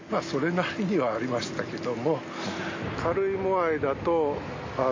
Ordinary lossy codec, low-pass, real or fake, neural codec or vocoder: MP3, 32 kbps; 7.2 kHz; real; none